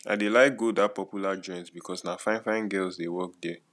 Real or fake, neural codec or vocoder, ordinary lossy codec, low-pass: real; none; none; none